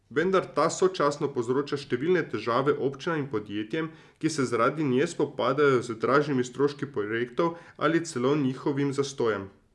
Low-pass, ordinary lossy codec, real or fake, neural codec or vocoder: none; none; real; none